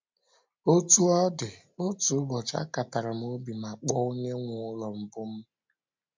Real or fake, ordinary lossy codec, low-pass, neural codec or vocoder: real; MP3, 64 kbps; 7.2 kHz; none